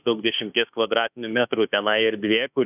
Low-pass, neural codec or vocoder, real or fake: 3.6 kHz; autoencoder, 48 kHz, 32 numbers a frame, DAC-VAE, trained on Japanese speech; fake